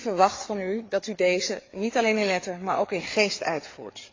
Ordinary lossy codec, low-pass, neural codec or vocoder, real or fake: AAC, 32 kbps; 7.2 kHz; codec, 16 kHz, 4 kbps, FreqCodec, larger model; fake